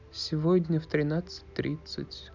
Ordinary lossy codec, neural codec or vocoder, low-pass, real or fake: none; none; 7.2 kHz; real